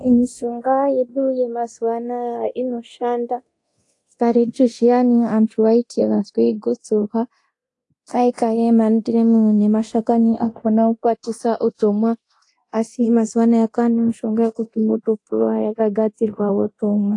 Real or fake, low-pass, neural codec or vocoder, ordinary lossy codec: fake; 10.8 kHz; codec, 24 kHz, 0.9 kbps, DualCodec; AAC, 48 kbps